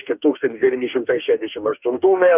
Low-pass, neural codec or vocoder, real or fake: 3.6 kHz; codec, 44.1 kHz, 2.6 kbps, DAC; fake